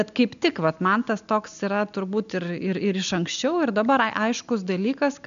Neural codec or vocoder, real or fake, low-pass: none; real; 7.2 kHz